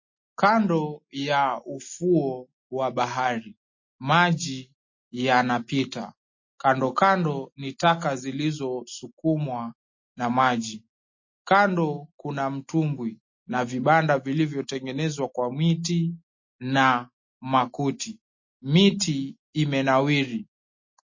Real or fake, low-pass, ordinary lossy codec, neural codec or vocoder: real; 7.2 kHz; MP3, 32 kbps; none